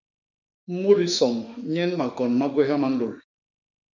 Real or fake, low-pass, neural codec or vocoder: fake; 7.2 kHz; autoencoder, 48 kHz, 32 numbers a frame, DAC-VAE, trained on Japanese speech